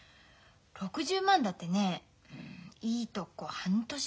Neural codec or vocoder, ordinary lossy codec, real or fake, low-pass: none; none; real; none